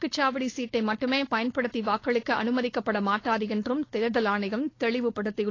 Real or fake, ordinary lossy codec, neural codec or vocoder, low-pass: fake; AAC, 32 kbps; codec, 16 kHz, 4.8 kbps, FACodec; 7.2 kHz